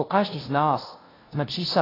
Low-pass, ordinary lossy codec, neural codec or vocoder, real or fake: 5.4 kHz; AAC, 24 kbps; codec, 16 kHz, 0.5 kbps, FunCodec, trained on LibriTTS, 25 frames a second; fake